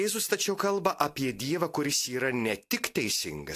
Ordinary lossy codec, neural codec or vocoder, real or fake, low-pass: AAC, 64 kbps; none; real; 14.4 kHz